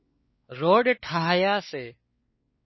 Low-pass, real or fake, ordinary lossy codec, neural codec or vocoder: 7.2 kHz; fake; MP3, 24 kbps; codec, 16 kHz, 4 kbps, X-Codec, WavLM features, trained on Multilingual LibriSpeech